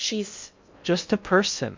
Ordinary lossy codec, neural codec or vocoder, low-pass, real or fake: MP3, 64 kbps; codec, 16 kHz in and 24 kHz out, 0.6 kbps, FocalCodec, streaming, 2048 codes; 7.2 kHz; fake